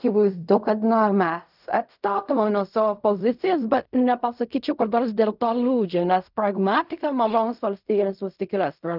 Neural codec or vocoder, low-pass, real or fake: codec, 16 kHz in and 24 kHz out, 0.4 kbps, LongCat-Audio-Codec, fine tuned four codebook decoder; 5.4 kHz; fake